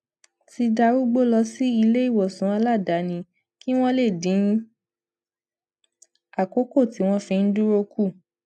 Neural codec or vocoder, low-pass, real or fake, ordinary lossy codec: none; none; real; none